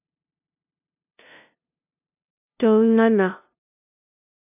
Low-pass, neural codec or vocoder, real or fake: 3.6 kHz; codec, 16 kHz, 0.5 kbps, FunCodec, trained on LibriTTS, 25 frames a second; fake